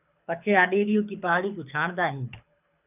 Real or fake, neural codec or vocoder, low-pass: fake; codec, 24 kHz, 6 kbps, HILCodec; 3.6 kHz